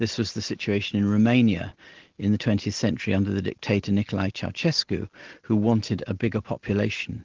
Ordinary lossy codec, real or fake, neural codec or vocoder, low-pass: Opus, 16 kbps; real; none; 7.2 kHz